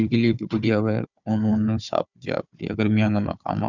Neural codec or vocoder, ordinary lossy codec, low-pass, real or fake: codec, 16 kHz, 4 kbps, FunCodec, trained on Chinese and English, 50 frames a second; none; 7.2 kHz; fake